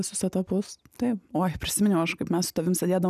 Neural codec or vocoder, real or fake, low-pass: none; real; 14.4 kHz